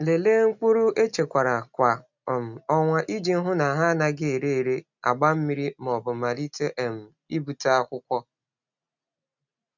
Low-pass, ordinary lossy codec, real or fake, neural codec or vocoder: 7.2 kHz; none; real; none